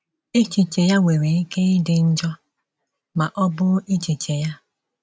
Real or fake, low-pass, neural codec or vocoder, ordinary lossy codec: real; none; none; none